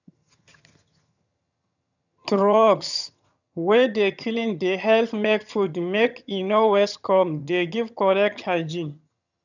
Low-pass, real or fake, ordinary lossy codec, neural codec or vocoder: 7.2 kHz; fake; none; vocoder, 22.05 kHz, 80 mel bands, HiFi-GAN